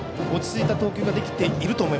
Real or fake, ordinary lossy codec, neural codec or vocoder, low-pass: real; none; none; none